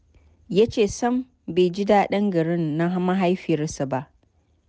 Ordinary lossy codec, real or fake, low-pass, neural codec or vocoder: none; real; none; none